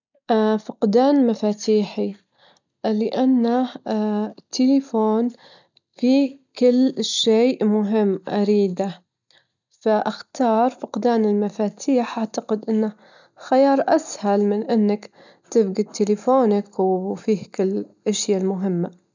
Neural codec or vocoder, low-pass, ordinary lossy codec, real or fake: none; 7.2 kHz; none; real